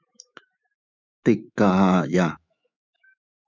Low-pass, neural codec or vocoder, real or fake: 7.2 kHz; autoencoder, 48 kHz, 128 numbers a frame, DAC-VAE, trained on Japanese speech; fake